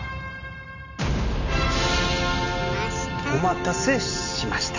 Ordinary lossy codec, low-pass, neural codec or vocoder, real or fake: none; 7.2 kHz; none; real